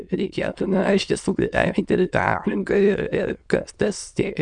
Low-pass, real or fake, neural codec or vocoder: 9.9 kHz; fake; autoencoder, 22.05 kHz, a latent of 192 numbers a frame, VITS, trained on many speakers